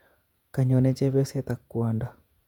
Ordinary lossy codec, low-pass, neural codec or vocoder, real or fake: none; 19.8 kHz; vocoder, 48 kHz, 128 mel bands, Vocos; fake